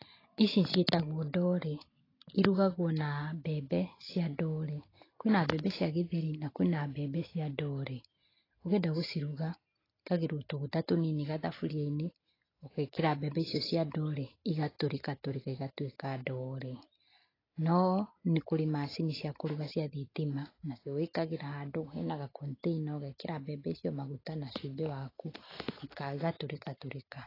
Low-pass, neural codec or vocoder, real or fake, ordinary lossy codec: 5.4 kHz; none; real; AAC, 24 kbps